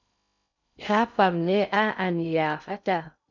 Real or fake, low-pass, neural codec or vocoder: fake; 7.2 kHz; codec, 16 kHz in and 24 kHz out, 0.6 kbps, FocalCodec, streaming, 2048 codes